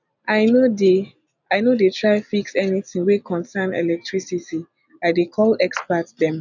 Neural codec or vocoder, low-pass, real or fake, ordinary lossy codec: none; 7.2 kHz; real; none